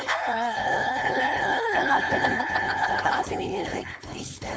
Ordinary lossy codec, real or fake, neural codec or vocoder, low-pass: none; fake; codec, 16 kHz, 4.8 kbps, FACodec; none